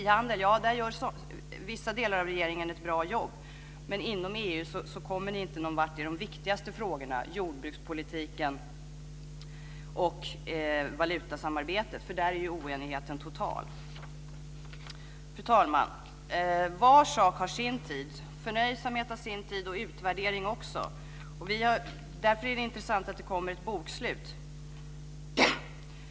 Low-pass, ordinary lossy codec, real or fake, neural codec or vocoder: none; none; real; none